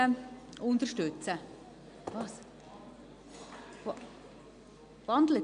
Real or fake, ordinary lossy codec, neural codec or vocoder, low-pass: real; MP3, 64 kbps; none; 9.9 kHz